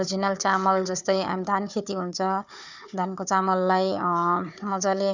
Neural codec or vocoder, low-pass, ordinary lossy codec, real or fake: codec, 16 kHz, 4 kbps, FunCodec, trained on Chinese and English, 50 frames a second; 7.2 kHz; none; fake